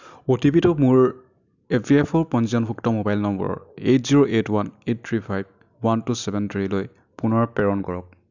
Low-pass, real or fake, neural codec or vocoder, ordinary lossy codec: 7.2 kHz; real; none; none